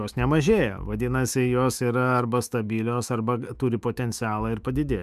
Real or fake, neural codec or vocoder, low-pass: fake; autoencoder, 48 kHz, 128 numbers a frame, DAC-VAE, trained on Japanese speech; 14.4 kHz